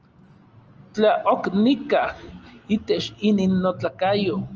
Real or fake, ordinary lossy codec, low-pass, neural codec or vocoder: real; Opus, 24 kbps; 7.2 kHz; none